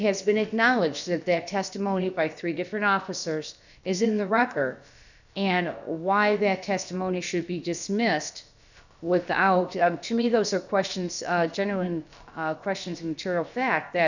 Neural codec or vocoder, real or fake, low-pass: codec, 16 kHz, about 1 kbps, DyCAST, with the encoder's durations; fake; 7.2 kHz